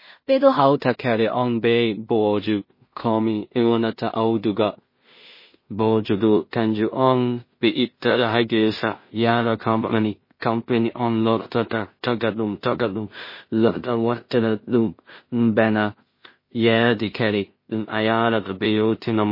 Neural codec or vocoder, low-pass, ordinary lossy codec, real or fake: codec, 16 kHz in and 24 kHz out, 0.4 kbps, LongCat-Audio-Codec, two codebook decoder; 5.4 kHz; MP3, 24 kbps; fake